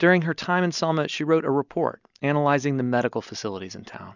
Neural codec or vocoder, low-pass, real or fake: none; 7.2 kHz; real